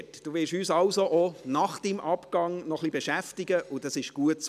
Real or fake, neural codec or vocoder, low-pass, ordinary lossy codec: real; none; 14.4 kHz; none